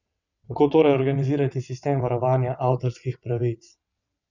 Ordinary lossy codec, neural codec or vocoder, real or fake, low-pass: none; vocoder, 22.05 kHz, 80 mel bands, WaveNeXt; fake; 7.2 kHz